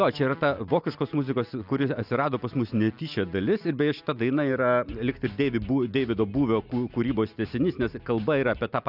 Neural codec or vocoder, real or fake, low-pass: none; real; 5.4 kHz